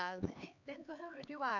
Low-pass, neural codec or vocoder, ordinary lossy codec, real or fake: 7.2 kHz; codec, 16 kHz, 4 kbps, X-Codec, HuBERT features, trained on LibriSpeech; none; fake